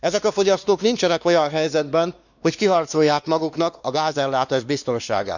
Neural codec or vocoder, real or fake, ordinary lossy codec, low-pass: codec, 16 kHz, 2 kbps, X-Codec, WavLM features, trained on Multilingual LibriSpeech; fake; none; 7.2 kHz